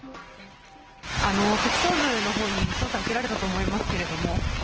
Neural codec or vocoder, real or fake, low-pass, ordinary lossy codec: none; real; 7.2 kHz; Opus, 16 kbps